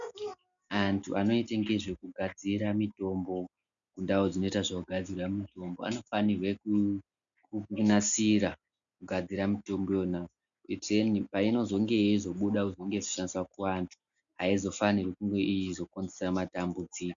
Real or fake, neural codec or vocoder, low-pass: real; none; 7.2 kHz